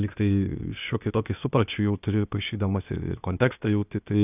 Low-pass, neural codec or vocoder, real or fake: 3.6 kHz; codec, 16 kHz, 0.8 kbps, ZipCodec; fake